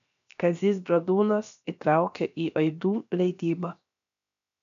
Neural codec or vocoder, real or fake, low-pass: codec, 16 kHz, 0.7 kbps, FocalCodec; fake; 7.2 kHz